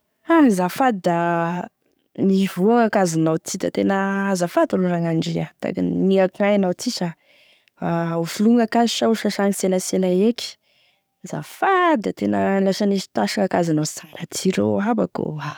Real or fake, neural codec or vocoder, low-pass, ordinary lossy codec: fake; codec, 44.1 kHz, 7.8 kbps, DAC; none; none